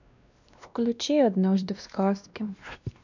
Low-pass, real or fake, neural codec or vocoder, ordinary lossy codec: 7.2 kHz; fake; codec, 16 kHz, 1 kbps, X-Codec, WavLM features, trained on Multilingual LibriSpeech; none